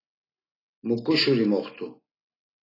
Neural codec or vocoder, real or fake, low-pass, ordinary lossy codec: none; real; 5.4 kHz; AAC, 24 kbps